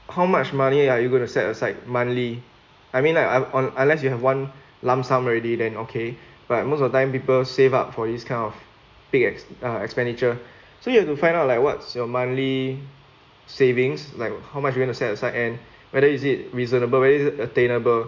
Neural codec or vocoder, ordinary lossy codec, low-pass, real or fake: none; MP3, 64 kbps; 7.2 kHz; real